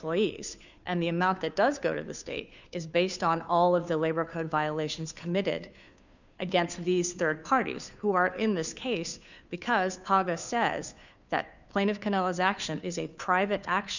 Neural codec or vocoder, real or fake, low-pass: codec, 16 kHz, 2 kbps, FunCodec, trained on Chinese and English, 25 frames a second; fake; 7.2 kHz